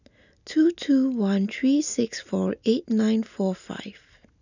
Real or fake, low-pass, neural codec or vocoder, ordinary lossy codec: real; 7.2 kHz; none; none